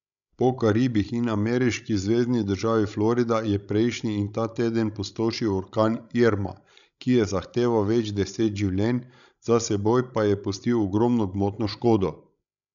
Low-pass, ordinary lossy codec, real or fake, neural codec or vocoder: 7.2 kHz; none; fake; codec, 16 kHz, 16 kbps, FreqCodec, larger model